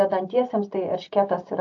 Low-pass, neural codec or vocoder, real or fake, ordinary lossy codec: 7.2 kHz; none; real; AAC, 48 kbps